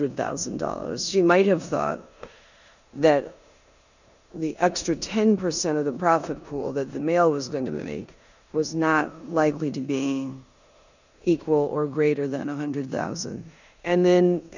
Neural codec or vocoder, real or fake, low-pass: codec, 16 kHz in and 24 kHz out, 0.9 kbps, LongCat-Audio-Codec, four codebook decoder; fake; 7.2 kHz